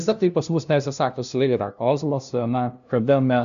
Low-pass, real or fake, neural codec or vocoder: 7.2 kHz; fake; codec, 16 kHz, 0.5 kbps, FunCodec, trained on LibriTTS, 25 frames a second